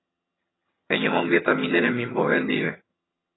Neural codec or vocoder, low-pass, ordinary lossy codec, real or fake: vocoder, 22.05 kHz, 80 mel bands, HiFi-GAN; 7.2 kHz; AAC, 16 kbps; fake